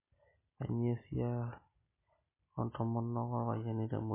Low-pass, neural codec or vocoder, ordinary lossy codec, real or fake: 3.6 kHz; none; MP3, 16 kbps; real